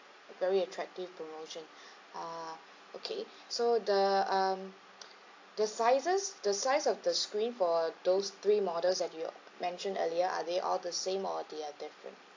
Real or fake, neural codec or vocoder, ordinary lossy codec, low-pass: real; none; AAC, 48 kbps; 7.2 kHz